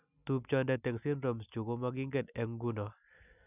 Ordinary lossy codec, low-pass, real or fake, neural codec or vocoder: none; 3.6 kHz; real; none